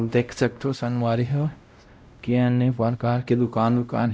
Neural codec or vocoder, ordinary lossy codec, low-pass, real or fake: codec, 16 kHz, 0.5 kbps, X-Codec, WavLM features, trained on Multilingual LibriSpeech; none; none; fake